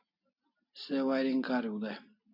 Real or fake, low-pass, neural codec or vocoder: real; 5.4 kHz; none